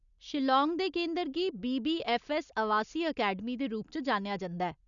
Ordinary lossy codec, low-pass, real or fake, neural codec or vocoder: none; 7.2 kHz; real; none